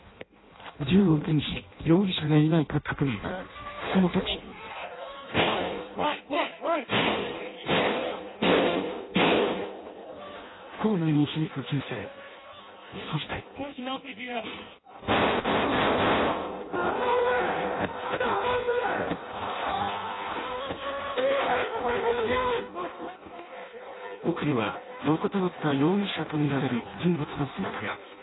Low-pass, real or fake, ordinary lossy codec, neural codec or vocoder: 7.2 kHz; fake; AAC, 16 kbps; codec, 16 kHz in and 24 kHz out, 0.6 kbps, FireRedTTS-2 codec